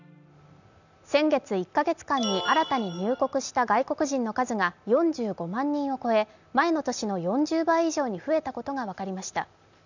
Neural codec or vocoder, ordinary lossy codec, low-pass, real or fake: none; none; 7.2 kHz; real